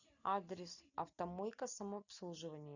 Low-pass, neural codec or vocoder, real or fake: 7.2 kHz; none; real